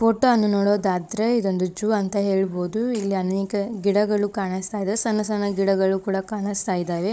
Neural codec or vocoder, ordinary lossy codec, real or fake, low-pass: codec, 16 kHz, 16 kbps, FunCodec, trained on Chinese and English, 50 frames a second; none; fake; none